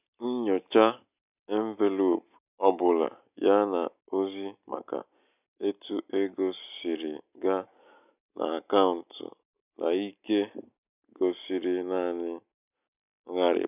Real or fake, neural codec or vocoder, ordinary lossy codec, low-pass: real; none; none; 3.6 kHz